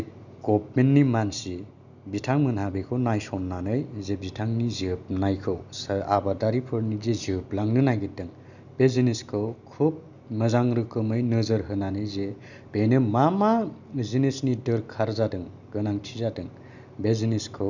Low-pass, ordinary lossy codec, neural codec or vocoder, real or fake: 7.2 kHz; none; none; real